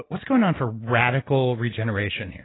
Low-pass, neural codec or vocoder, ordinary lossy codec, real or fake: 7.2 kHz; none; AAC, 16 kbps; real